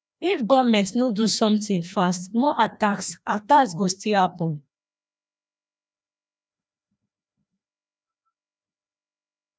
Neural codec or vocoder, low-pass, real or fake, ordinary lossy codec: codec, 16 kHz, 1 kbps, FreqCodec, larger model; none; fake; none